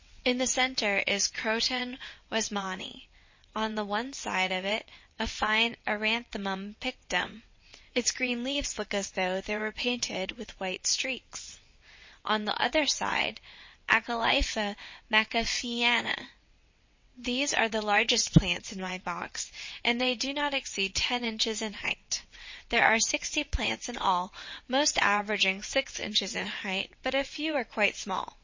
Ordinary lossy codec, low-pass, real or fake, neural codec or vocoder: MP3, 32 kbps; 7.2 kHz; fake; vocoder, 22.05 kHz, 80 mel bands, WaveNeXt